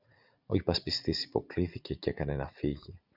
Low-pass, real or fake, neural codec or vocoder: 5.4 kHz; fake; vocoder, 44.1 kHz, 80 mel bands, Vocos